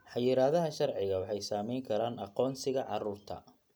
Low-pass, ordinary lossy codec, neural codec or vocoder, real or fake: none; none; none; real